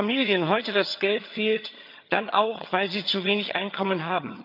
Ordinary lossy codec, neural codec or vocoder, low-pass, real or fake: none; vocoder, 22.05 kHz, 80 mel bands, HiFi-GAN; 5.4 kHz; fake